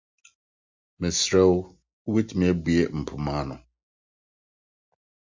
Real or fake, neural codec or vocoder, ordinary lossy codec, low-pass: real; none; MP3, 64 kbps; 7.2 kHz